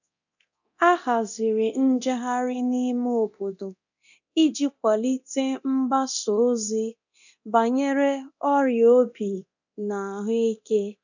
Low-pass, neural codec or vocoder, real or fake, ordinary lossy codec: 7.2 kHz; codec, 24 kHz, 0.9 kbps, DualCodec; fake; none